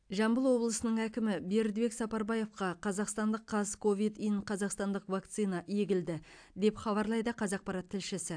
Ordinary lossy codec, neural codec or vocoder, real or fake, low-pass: AAC, 64 kbps; none; real; 9.9 kHz